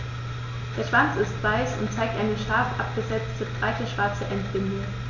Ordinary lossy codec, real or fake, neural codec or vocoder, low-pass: none; real; none; 7.2 kHz